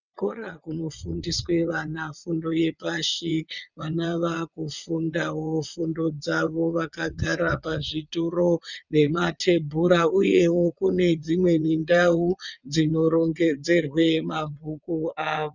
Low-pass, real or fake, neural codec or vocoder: 7.2 kHz; fake; vocoder, 22.05 kHz, 80 mel bands, WaveNeXt